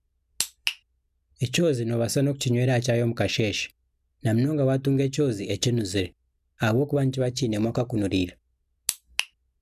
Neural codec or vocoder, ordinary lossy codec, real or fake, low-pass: none; none; real; 14.4 kHz